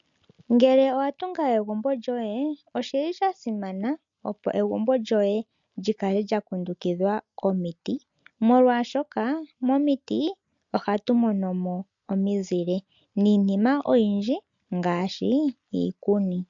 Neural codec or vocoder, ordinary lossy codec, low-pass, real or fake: none; MP3, 64 kbps; 7.2 kHz; real